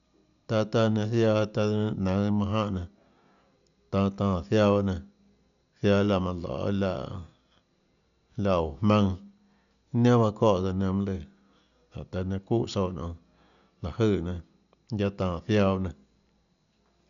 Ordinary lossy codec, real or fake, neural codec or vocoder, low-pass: none; real; none; 7.2 kHz